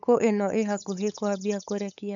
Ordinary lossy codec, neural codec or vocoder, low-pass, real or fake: none; codec, 16 kHz, 8 kbps, FunCodec, trained on LibriTTS, 25 frames a second; 7.2 kHz; fake